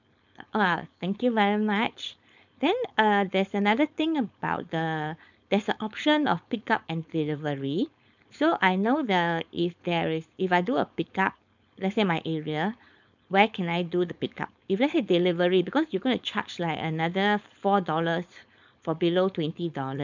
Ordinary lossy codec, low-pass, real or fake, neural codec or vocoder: none; 7.2 kHz; fake; codec, 16 kHz, 4.8 kbps, FACodec